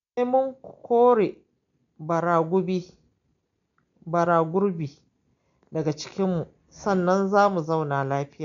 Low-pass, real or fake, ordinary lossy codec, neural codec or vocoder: 7.2 kHz; real; none; none